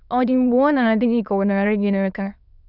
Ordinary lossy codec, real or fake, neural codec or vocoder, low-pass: none; fake; autoencoder, 22.05 kHz, a latent of 192 numbers a frame, VITS, trained on many speakers; 5.4 kHz